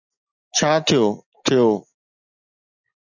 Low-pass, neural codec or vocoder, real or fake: 7.2 kHz; none; real